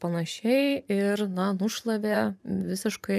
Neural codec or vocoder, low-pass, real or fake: vocoder, 44.1 kHz, 128 mel bands every 512 samples, BigVGAN v2; 14.4 kHz; fake